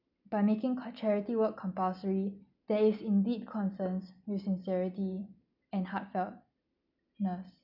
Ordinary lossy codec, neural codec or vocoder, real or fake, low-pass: none; none; real; 5.4 kHz